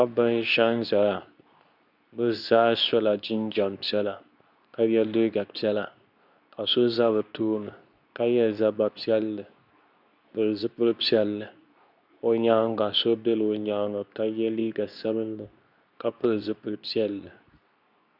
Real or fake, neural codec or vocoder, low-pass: fake; codec, 24 kHz, 0.9 kbps, WavTokenizer, medium speech release version 2; 5.4 kHz